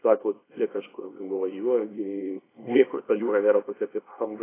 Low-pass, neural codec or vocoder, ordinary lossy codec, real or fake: 3.6 kHz; codec, 24 kHz, 0.9 kbps, WavTokenizer, small release; AAC, 16 kbps; fake